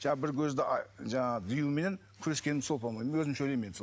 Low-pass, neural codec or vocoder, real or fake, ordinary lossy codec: none; none; real; none